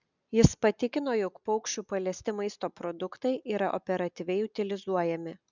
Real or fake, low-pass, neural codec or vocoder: real; 7.2 kHz; none